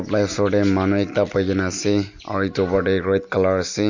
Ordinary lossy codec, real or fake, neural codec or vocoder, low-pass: Opus, 64 kbps; real; none; 7.2 kHz